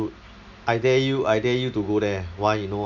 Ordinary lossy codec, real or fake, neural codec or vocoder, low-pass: none; real; none; 7.2 kHz